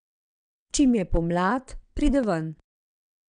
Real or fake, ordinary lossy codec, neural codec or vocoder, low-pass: fake; none; vocoder, 22.05 kHz, 80 mel bands, WaveNeXt; 9.9 kHz